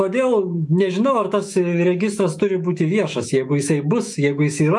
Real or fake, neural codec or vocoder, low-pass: fake; autoencoder, 48 kHz, 128 numbers a frame, DAC-VAE, trained on Japanese speech; 10.8 kHz